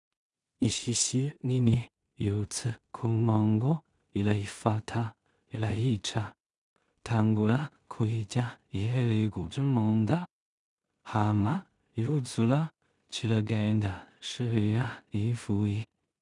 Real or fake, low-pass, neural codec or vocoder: fake; 10.8 kHz; codec, 16 kHz in and 24 kHz out, 0.4 kbps, LongCat-Audio-Codec, two codebook decoder